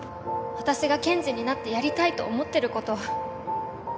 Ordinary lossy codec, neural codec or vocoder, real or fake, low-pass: none; none; real; none